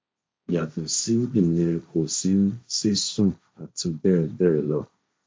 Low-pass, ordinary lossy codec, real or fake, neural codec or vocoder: none; none; fake; codec, 16 kHz, 1.1 kbps, Voila-Tokenizer